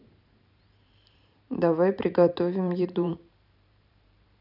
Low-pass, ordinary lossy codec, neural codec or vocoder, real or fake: 5.4 kHz; none; vocoder, 44.1 kHz, 128 mel bands every 512 samples, BigVGAN v2; fake